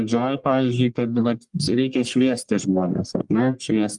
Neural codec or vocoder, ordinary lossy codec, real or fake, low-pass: codec, 44.1 kHz, 1.7 kbps, Pupu-Codec; Opus, 32 kbps; fake; 10.8 kHz